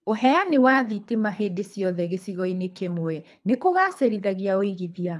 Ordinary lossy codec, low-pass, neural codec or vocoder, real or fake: none; none; codec, 24 kHz, 3 kbps, HILCodec; fake